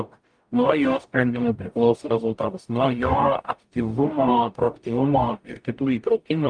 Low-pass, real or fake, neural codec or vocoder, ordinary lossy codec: 9.9 kHz; fake; codec, 44.1 kHz, 0.9 kbps, DAC; Opus, 32 kbps